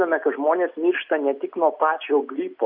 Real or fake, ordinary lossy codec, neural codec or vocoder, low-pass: real; MP3, 48 kbps; none; 5.4 kHz